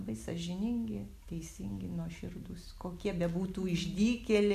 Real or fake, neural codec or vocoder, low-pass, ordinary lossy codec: real; none; 14.4 kHz; AAC, 96 kbps